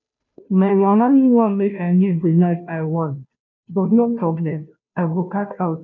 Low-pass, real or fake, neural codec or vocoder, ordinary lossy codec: 7.2 kHz; fake; codec, 16 kHz, 0.5 kbps, FunCodec, trained on Chinese and English, 25 frames a second; none